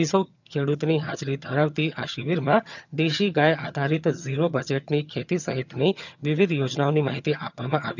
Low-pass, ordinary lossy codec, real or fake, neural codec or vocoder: 7.2 kHz; none; fake; vocoder, 22.05 kHz, 80 mel bands, HiFi-GAN